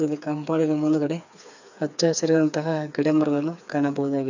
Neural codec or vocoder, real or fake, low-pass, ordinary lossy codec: codec, 16 kHz, 4 kbps, FreqCodec, smaller model; fake; 7.2 kHz; none